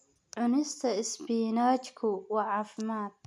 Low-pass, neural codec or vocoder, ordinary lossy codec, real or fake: none; none; none; real